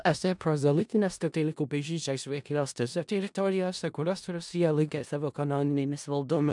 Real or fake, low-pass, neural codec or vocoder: fake; 10.8 kHz; codec, 16 kHz in and 24 kHz out, 0.4 kbps, LongCat-Audio-Codec, four codebook decoder